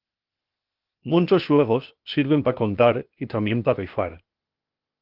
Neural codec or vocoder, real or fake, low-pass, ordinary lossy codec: codec, 16 kHz, 0.8 kbps, ZipCodec; fake; 5.4 kHz; Opus, 32 kbps